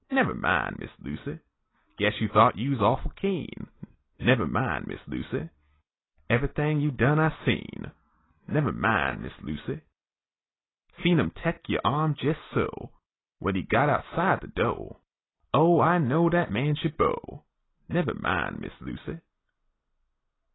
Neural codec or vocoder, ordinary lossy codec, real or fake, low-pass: none; AAC, 16 kbps; real; 7.2 kHz